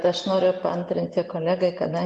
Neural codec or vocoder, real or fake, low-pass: none; real; 10.8 kHz